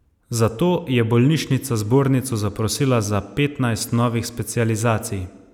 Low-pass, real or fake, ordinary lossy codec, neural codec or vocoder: 19.8 kHz; real; none; none